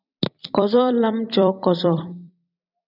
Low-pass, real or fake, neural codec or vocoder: 5.4 kHz; fake; vocoder, 44.1 kHz, 128 mel bands every 256 samples, BigVGAN v2